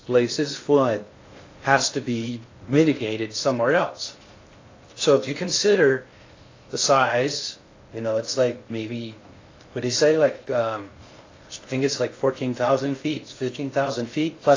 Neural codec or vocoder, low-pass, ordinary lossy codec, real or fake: codec, 16 kHz in and 24 kHz out, 0.6 kbps, FocalCodec, streaming, 2048 codes; 7.2 kHz; AAC, 32 kbps; fake